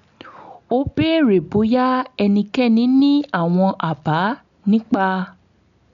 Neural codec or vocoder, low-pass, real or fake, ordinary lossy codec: none; 7.2 kHz; real; none